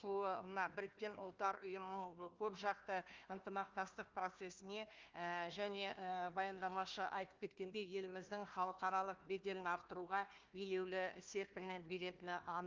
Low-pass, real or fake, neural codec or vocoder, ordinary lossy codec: 7.2 kHz; fake; codec, 16 kHz, 1 kbps, FunCodec, trained on Chinese and English, 50 frames a second; Opus, 24 kbps